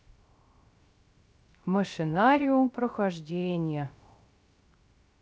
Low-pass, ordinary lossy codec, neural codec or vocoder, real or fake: none; none; codec, 16 kHz, 0.3 kbps, FocalCodec; fake